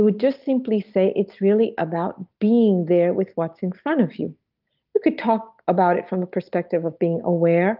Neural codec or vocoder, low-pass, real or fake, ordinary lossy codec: none; 5.4 kHz; real; Opus, 24 kbps